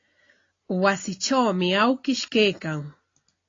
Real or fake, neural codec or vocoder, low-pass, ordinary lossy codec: real; none; 7.2 kHz; AAC, 32 kbps